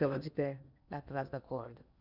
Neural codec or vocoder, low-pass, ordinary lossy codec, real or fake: codec, 16 kHz in and 24 kHz out, 0.8 kbps, FocalCodec, streaming, 65536 codes; 5.4 kHz; none; fake